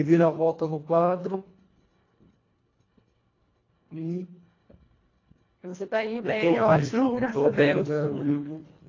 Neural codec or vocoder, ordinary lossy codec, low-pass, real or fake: codec, 24 kHz, 1.5 kbps, HILCodec; AAC, 32 kbps; 7.2 kHz; fake